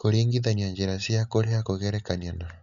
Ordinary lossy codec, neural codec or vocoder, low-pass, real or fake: none; none; 7.2 kHz; real